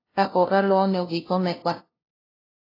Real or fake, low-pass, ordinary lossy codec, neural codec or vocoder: fake; 5.4 kHz; AAC, 24 kbps; codec, 16 kHz, 0.5 kbps, FunCodec, trained on LibriTTS, 25 frames a second